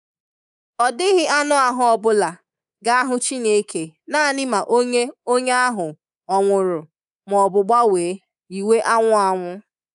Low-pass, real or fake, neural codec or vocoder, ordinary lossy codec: 10.8 kHz; fake; codec, 24 kHz, 3.1 kbps, DualCodec; none